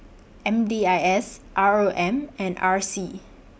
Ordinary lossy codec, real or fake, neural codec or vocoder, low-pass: none; real; none; none